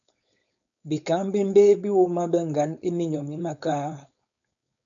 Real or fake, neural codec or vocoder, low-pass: fake; codec, 16 kHz, 4.8 kbps, FACodec; 7.2 kHz